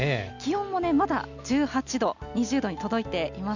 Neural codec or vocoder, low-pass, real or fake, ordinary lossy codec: none; 7.2 kHz; real; none